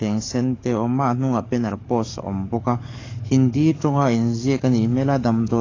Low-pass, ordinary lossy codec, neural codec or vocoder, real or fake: 7.2 kHz; AAC, 32 kbps; codec, 44.1 kHz, 7.8 kbps, DAC; fake